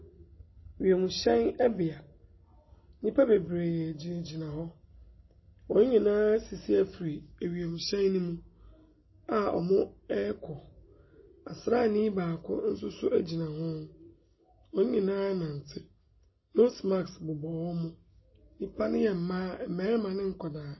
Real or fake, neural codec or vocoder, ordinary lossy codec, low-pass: real; none; MP3, 24 kbps; 7.2 kHz